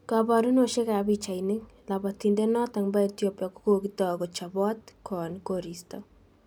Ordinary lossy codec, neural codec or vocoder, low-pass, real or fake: none; none; none; real